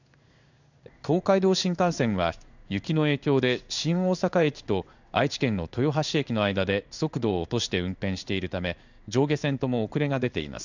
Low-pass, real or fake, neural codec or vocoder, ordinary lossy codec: 7.2 kHz; fake; codec, 16 kHz in and 24 kHz out, 1 kbps, XY-Tokenizer; none